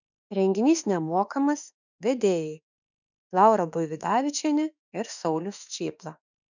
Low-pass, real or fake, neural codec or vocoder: 7.2 kHz; fake; autoencoder, 48 kHz, 32 numbers a frame, DAC-VAE, trained on Japanese speech